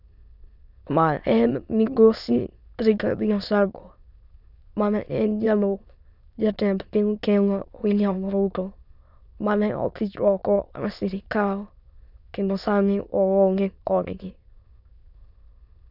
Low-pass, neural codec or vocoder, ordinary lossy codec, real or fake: 5.4 kHz; autoencoder, 22.05 kHz, a latent of 192 numbers a frame, VITS, trained on many speakers; none; fake